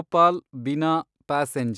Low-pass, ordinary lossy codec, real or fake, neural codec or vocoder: none; none; real; none